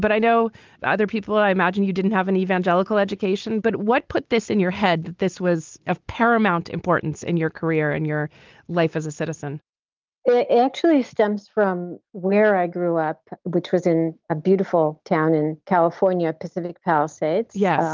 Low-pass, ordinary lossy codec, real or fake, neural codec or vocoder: 7.2 kHz; Opus, 32 kbps; real; none